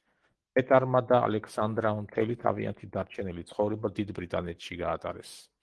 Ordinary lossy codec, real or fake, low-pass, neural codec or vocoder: Opus, 16 kbps; real; 10.8 kHz; none